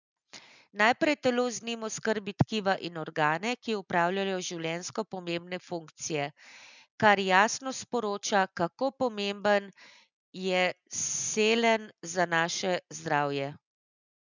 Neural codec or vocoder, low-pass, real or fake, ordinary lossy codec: none; 7.2 kHz; real; none